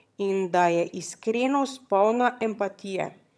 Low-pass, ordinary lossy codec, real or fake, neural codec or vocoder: none; none; fake; vocoder, 22.05 kHz, 80 mel bands, HiFi-GAN